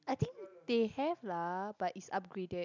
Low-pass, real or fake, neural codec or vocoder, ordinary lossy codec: 7.2 kHz; real; none; none